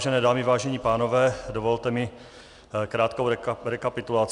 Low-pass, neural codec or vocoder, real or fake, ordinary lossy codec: 10.8 kHz; none; real; Opus, 64 kbps